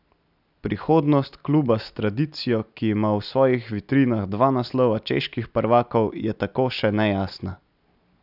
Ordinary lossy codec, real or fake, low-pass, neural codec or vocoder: none; real; 5.4 kHz; none